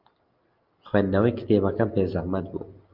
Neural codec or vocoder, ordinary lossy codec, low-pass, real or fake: none; Opus, 24 kbps; 5.4 kHz; real